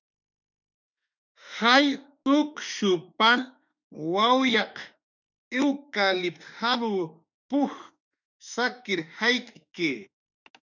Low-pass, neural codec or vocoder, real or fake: 7.2 kHz; autoencoder, 48 kHz, 32 numbers a frame, DAC-VAE, trained on Japanese speech; fake